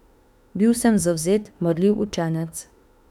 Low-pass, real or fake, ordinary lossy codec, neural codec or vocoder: 19.8 kHz; fake; none; autoencoder, 48 kHz, 32 numbers a frame, DAC-VAE, trained on Japanese speech